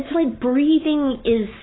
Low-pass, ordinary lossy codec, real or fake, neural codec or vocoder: 7.2 kHz; AAC, 16 kbps; fake; codec, 44.1 kHz, 7.8 kbps, Pupu-Codec